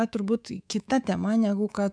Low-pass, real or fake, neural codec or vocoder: 9.9 kHz; fake; codec, 24 kHz, 3.1 kbps, DualCodec